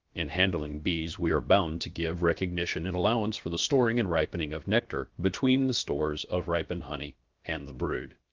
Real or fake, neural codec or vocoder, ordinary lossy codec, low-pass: fake; codec, 16 kHz, about 1 kbps, DyCAST, with the encoder's durations; Opus, 24 kbps; 7.2 kHz